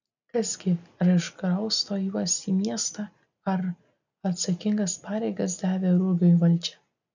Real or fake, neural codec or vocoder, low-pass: real; none; 7.2 kHz